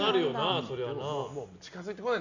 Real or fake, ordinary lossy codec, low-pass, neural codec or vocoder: real; none; 7.2 kHz; none